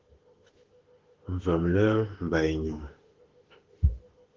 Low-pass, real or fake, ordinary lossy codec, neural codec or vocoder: 7.2 kHz; fake; Opus, 16 kbps; autoencoder, 48 kHz, 32 numbers a frame, DAC-VAE, trained on Japanese speech